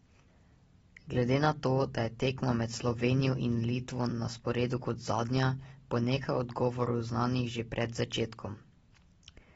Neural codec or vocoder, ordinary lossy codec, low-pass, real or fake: none; AAC, 24 kbps; 19.8 kHz; real